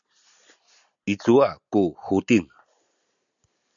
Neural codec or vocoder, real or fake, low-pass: none; real; 7.2 kHz